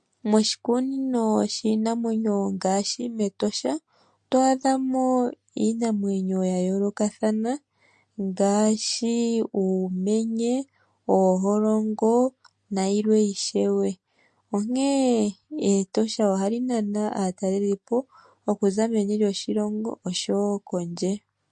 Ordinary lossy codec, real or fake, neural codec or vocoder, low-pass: MP3, 48 kbps; real; none; 9.9 kHz